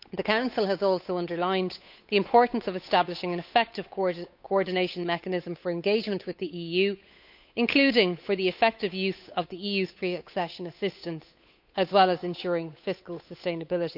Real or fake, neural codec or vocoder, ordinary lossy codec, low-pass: fake; codec, 16 kHz, 8 kbps, FunCodec, trained on Chinese and English, 25 frames a second; none; 5.4 kHz